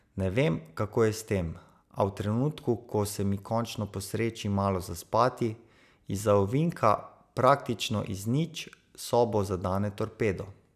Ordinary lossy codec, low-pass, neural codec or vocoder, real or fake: none; 14.4 kHz; none; real